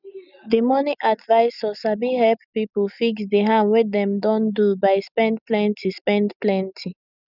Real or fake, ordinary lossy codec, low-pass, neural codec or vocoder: real; none; 5.4 kHz; none